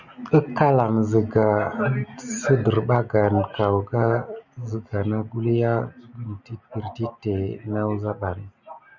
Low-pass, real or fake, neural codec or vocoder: 7.2 kHz; real; none